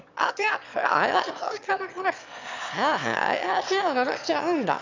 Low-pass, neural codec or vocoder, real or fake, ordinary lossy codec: 7.2 kHz; autoencoder, 22.05 kHz, a latent of 192 numbers a frame, VITS, trained on one speaker; fake; MP3, 64 kbps